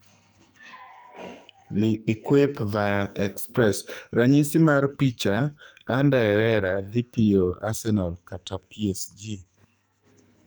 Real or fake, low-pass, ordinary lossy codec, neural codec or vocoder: fake; none; none; codec, 44.1 kHz, 2.6 kbps, SNAC